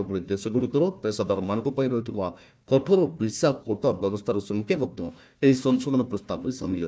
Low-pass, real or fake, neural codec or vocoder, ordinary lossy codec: none; fake; codec, 16 kHz, 1 kbps, FunCodec, trained on Chinese and English, 50 frames a second; none